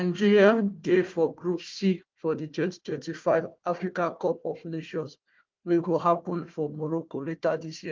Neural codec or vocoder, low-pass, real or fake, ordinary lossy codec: codec, 16 kHz, 1 kbps, FunCodec, trained on Chinese and English, 50 frames a second; 7.2 kHz; fake; Opus, 32 kbps